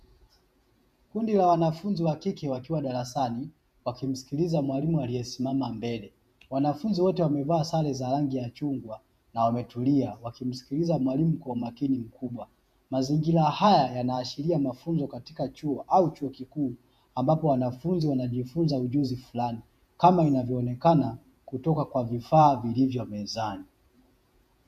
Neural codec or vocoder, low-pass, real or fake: vocoder, 48 kHz, 128 mel bands, Vocos; 14.4 kHz; fake